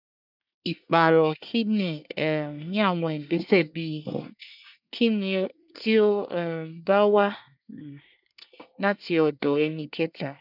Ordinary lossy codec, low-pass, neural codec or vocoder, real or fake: none; 5.4 kHz; codec, 24 kHz, 1 kbps, SNAC; fake